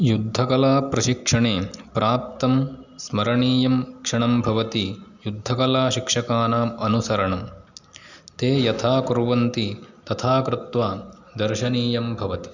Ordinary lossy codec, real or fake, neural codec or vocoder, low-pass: none; real; none; 7.2 kHz